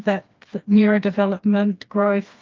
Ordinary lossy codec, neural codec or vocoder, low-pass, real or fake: Opus, 24 kbps; codec, 16 kHz, 2 kbps, FreqCodec, smaller model; 7.2 kHz; fake